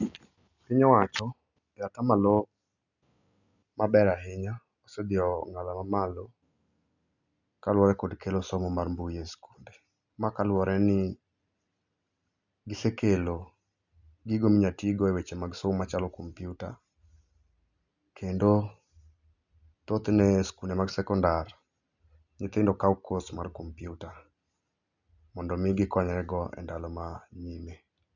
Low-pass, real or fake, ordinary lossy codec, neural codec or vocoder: 7.2 kHz; real; none; none